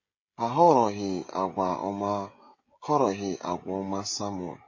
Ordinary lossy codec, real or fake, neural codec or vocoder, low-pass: MP3, 32 kbps; fake; codec, 16 kHz, 16 kbps, FreqCodec, smaller model; 7.2 kHz